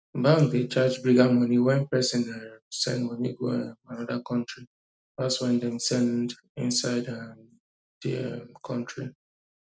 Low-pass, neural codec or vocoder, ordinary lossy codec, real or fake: none; none; none; real